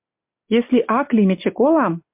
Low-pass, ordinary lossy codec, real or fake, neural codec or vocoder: 3.6 kHz; MP3, 32 kbps; real; none